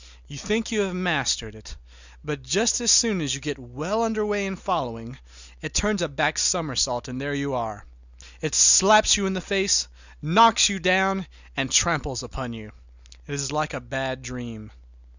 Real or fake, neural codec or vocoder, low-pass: real; none; 7.2 kHz